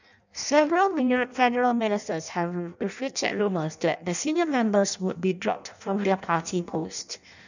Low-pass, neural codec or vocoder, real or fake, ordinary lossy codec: 7.2 kHz; codec, 16 kHz in and 24 kHz out, 0.6 kbps, FireRedTTS-2 codec; fake; none